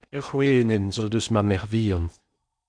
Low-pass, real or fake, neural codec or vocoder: 9.9 kHz; fake; codec, 16 kHz in and 24 kHz out, 0.6 kbps, FocalCodec, streaming, 4096 codes